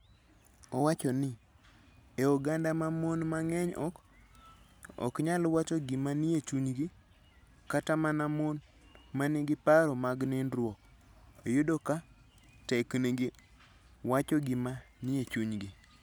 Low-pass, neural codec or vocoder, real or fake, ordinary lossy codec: none; none; real; none